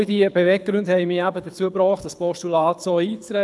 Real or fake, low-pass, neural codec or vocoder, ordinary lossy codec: fake; none; codec, 24 kHz, 6 kbps, HILCodec; none